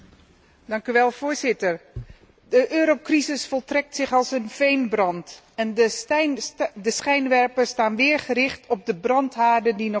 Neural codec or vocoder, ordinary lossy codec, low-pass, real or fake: none; none; none; real